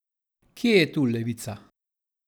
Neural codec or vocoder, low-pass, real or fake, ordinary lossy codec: none; none; real; none